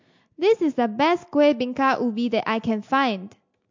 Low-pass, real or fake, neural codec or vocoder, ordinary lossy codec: 7.2 kHz; real; none; MP3, 48 kbps